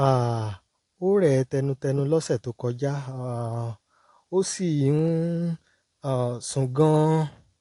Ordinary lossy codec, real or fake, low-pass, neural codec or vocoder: AAC, 48 kbps; real; 10.8 kHz; none